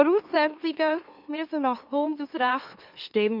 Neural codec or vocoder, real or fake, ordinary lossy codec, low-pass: autoencoder, 44.1 kHz, a latent of 192 numbers a frame, MeloTTS; fake; none; 5.4 kHz